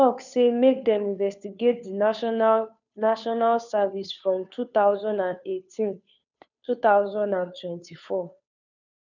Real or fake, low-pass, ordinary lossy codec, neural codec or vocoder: fake; 7.2 kHz; none; codec, 16 kHz, 2 kbps, FunCodec, trained on Chinese and English, 25 frames a second